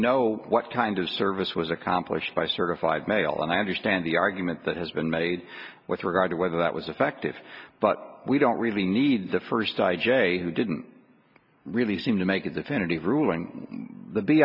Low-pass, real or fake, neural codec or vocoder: 5.4 kHz; real; none